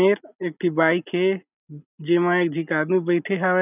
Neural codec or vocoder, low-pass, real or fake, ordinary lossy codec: none; 3.6 kHz; real; none